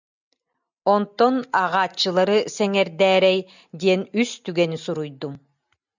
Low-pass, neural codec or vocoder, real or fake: 7.2 kHz; none; real